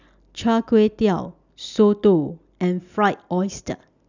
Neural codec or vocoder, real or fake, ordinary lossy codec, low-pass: none; real; none; 7.2 kHz